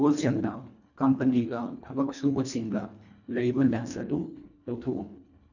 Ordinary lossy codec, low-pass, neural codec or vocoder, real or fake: none; 7.2 kHz; codec, 24 kHz, 1.5 kbps, HILCodec; fake